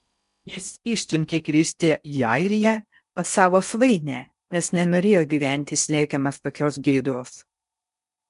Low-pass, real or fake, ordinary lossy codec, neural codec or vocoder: 10.8 kHz; fake; MP3, 96 kbps; codec, 16 kHz in and 24 kHz out, 0.6 kbps, FocalCodec, streaming, 4096 codes